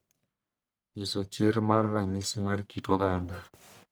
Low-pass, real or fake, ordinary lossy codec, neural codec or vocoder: none; fake; none; codec, 44.1 kHz, 1.7 kbps, Pupu-Codec